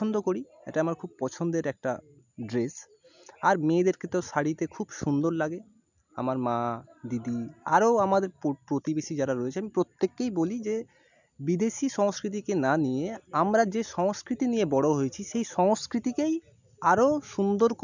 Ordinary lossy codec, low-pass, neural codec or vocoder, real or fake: none; 7.2 kHz; none; real